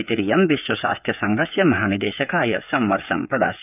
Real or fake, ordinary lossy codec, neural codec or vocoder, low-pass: fake; none; codec, 16 kHz, 8 kbps, FreqCodec, smaller model; 3.6 kHz